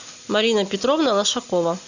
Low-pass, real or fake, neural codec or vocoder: 7.2 kHz; real; none